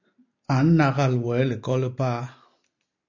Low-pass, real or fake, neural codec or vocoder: 7.2 kHz; real; none